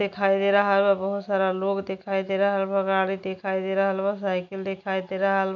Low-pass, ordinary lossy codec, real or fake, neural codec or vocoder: 7.2 kHz; none; real; none